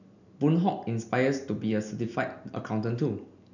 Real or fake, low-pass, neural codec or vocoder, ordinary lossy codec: real; 7.2 kHz; none; none